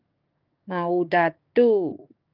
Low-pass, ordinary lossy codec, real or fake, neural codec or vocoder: 5.4 kHz; Opus, 32 kbps; fake; codec, 16 kHz, 6 kbps, DAC